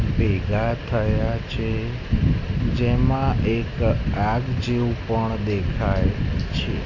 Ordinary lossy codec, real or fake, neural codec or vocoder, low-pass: none; real; none; 7.2 kHz